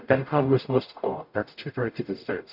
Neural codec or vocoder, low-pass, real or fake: codec, 44.1 kHz, 0.9 kbps, DAC; 5.4 kHz; fake